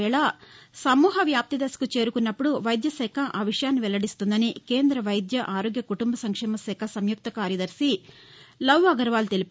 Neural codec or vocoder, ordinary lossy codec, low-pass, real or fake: none; none; none; real